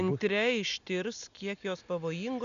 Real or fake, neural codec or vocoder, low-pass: real; none; 7.2 kHz